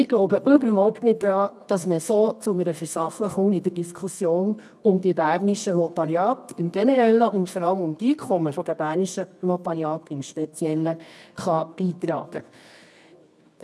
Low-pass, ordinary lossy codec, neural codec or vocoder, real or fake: none; none; codec, 24 kHz, 0.9 kbps, WavTokenizer, medium music audio release; fake